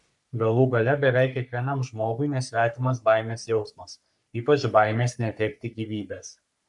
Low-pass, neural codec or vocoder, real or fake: 10.8 kHz; codec, 44.1 kHz, 3.4 kbps, Pupu-Codec; fake